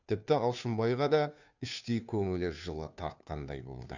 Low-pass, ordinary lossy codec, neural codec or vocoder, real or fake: 7.2 kHz; none; codec, 16 kHz, 2 kbps, FunCodec, trained on Chinese and English, 25 frames a second; fake